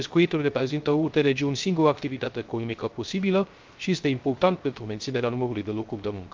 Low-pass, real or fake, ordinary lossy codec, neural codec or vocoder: 7.2 kHz; fake; Opus, 24 kbps; codec, 16 kHz, 0.3 kbps, FocalCodec